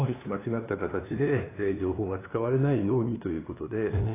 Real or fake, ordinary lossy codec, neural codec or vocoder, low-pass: fake; AAC, 16 kbps; codec, 16 kHz, 2 kbps, FunCodec, trained on LibriTTS, 25 frames a second; 3.6 kHz